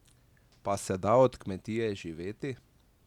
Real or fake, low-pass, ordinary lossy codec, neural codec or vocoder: real; 19.8 kHz; none; none